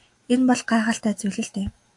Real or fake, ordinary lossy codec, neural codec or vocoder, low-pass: fake; AAC, 64 kbps; autoencoder, 48 kHz, 128 numbers a frame, DAC-VAE, trained on Japanese speech; 10.8 kHz